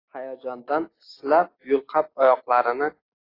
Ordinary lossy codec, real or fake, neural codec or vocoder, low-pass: AAC, 24 kbps; real; none; 5.4 kHz